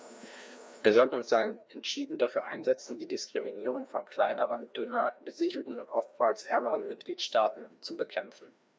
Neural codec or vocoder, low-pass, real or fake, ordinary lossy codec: codec, 16 kHz, 1 kbps, FreqCodec, larger model; none; fake; none